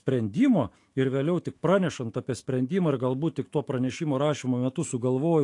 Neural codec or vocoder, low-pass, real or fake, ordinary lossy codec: autoencoder, 48 kHz, 128 numbers a frame, DAC-VAE, trained on Japanese speech; 10.8 kHz; fake; AAC, 48 kbps